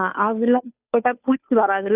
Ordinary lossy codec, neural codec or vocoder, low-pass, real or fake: none; codec, 16 kHz, 2 kbps, FunCodec, trained on Chinese and English, 25 frames a second; 3.6 kHz; fake